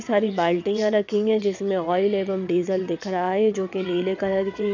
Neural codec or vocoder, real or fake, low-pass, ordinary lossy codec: vocoder, 22.05 kHz, 80 mel bands, WaveNeXt; fake; 7.2 kHz; none